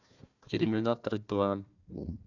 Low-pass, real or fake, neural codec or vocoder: 7.2 kHz; fake; codec, 16 kHz, 1 kbps, FunCodec, trained on Chinese and English, 50 frames a second